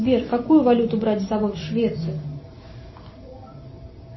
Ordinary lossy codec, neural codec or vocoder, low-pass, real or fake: MP3, 24 kbps; none; 7.2 kHz; real